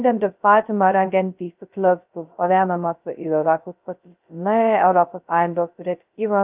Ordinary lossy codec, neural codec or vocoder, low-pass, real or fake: Opus, 24 kbps; codec, 16 kHz, 0.2 kbps, FocalCodec; 3.6 kHz; fake